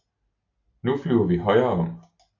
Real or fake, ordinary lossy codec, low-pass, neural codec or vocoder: real; AAC, 48 kbps; 7.2 kHz; none